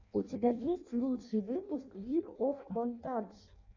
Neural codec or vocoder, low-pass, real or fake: codec, 16 kHz in and 24 kHz out, 0.6 kbps, FireRedTTS-2 codec; 7.2 kHz; fake